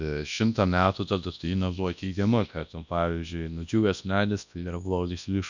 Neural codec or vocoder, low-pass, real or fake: codec, 24 kHz, 0.9 kbps, WavTokenizer, large speech release; 7.2 kHz; fake